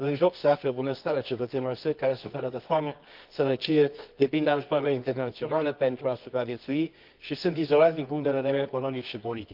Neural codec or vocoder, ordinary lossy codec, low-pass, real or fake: codec, 24 kHz, 0.9 kbps, WavTokenizer, medium music audio release; Opus, 24 kbps; 5.4 kHz; fake